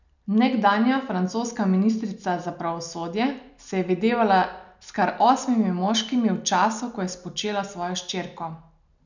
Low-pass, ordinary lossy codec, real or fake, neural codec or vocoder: 7.2 kHz; none; real; none